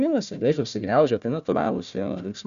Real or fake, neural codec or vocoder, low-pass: fake; codec, 16 kHz, 1 kbps, FunCodec, trained on Chinese and English, 50 frames a second; 7.2 kHz